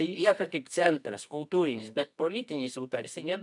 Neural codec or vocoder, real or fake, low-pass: codec, 24 kHz, 0.9 kbps, WavTokenizer, medium music audio release; fake; 10.8 kHz